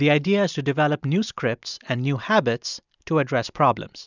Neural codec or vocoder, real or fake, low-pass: none; real; 7.2 kHz